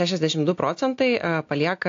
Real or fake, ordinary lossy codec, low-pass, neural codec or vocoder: real; AAC, 48 kbps; 7.2 kHz; none